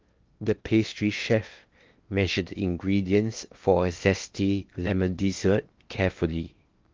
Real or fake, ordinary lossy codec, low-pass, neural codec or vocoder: fake; Opus, 32 kbps; 7.2 kHz; codec, 16 kHz in and 24 kHz out, 0.8 kbps, FocalCodec, streaming, 65536 codes